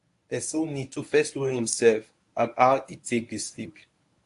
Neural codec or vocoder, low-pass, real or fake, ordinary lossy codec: codec, 24 kHz, 0.9 kbps, WavTokenizer, medium speech release version 1; 10.8 kHz; fake; AAC, 48 kbps